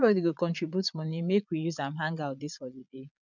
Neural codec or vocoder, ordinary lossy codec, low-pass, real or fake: vocoder, 22.05 kHz, 80 mel bands, Vocos; none; 7.2 kHz; fake